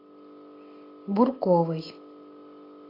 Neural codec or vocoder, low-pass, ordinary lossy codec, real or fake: none; 5.4 kHz; AAC, 24 kbps; real